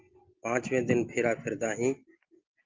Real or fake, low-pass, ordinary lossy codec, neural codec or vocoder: real; 7.2 kHz; Opus, 32 kbps; none